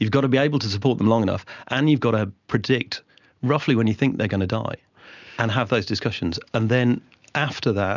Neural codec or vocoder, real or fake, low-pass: none; real; 7.2 kHz